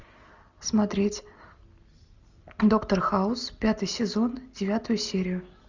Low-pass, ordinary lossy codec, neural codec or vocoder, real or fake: 7.2 kHz; Opus, 64 kbps; none; real